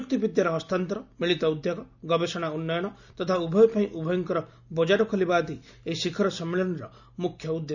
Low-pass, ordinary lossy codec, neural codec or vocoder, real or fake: 7.2 kHz; none; none; real